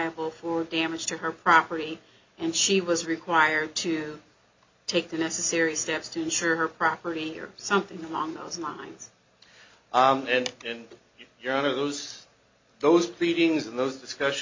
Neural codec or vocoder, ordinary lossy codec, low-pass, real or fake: none; MP3, 48 kbps; 7.2 kHz; real